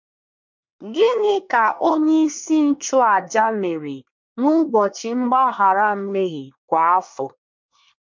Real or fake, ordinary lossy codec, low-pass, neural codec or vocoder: fake; MP3, 48 kbps; 7.2 kHz; codec, 24 kHz, 1 kbps, SNAC